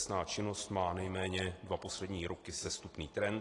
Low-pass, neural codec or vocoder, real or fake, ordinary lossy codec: 10.8 kHz; none; real; AAC, 32 kbps